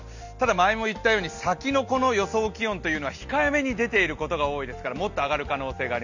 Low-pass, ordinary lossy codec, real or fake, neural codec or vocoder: 7.2 kHz; none; real; none